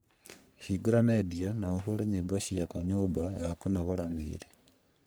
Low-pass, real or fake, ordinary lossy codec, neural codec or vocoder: none; fake; none; codec, 44.1 kHz, 3.4 kbps, Pupu-Codec